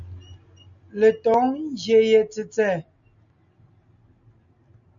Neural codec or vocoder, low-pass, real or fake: none; 7.2 kHz; real